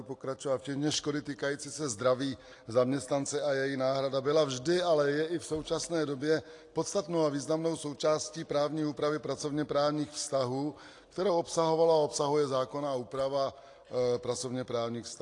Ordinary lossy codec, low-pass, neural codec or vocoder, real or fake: AAC, 48 kbps; 10.8 kHz; none; real